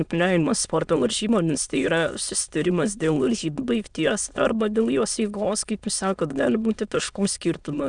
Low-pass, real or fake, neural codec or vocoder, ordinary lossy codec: 9.9 kHz; fake; autoencoder, 22.05 kHz, a latent of 192 numbers a frame, VITS, trained on many speakers; Opus, 64 kbps